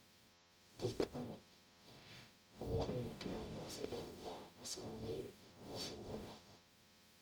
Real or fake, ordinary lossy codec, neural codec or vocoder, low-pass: fake; none; codec, 44.1 kHz, 0.9 kbps, DAC; none